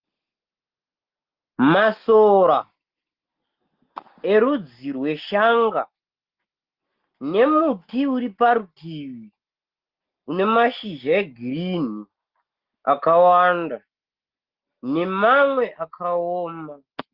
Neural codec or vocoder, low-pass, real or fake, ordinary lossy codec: none; 5.4 kHz; real; Opus, 16 kbps